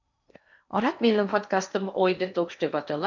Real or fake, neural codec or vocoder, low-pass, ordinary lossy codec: fake; codec, 16 kHz in and 24 kHz out, 0.8 kbps, FocalCodec, streaming, 65536 codes; 7.2 kHz; none